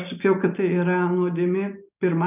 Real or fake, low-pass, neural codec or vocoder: real; 3.6 kHz; none